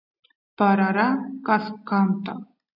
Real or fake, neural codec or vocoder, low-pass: real; none; 5.4 kHz